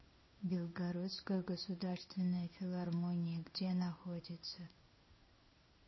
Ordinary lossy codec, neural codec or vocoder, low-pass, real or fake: MP3, 24 kbps; codec, 16 kHz in and 24 kHz out, 1 kbps, XY-Tokenizer; 7.2 kHz; fake